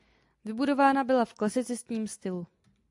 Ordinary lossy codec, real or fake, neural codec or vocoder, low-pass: AAC, 64 kbps; real; none; 10.8 kHz